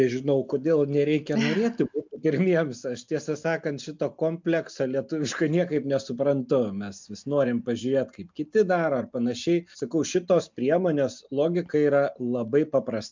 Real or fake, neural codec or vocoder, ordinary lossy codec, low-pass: real; none; MP3, 64 kbps; 7.2 kHz